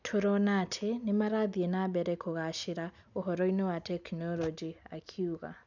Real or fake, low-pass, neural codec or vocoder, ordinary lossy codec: real; 7.2 kHz; none; none